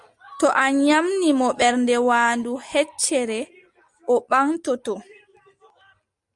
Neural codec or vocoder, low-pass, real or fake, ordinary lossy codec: none; 10.8 kHz; real; Opus, 64 kbps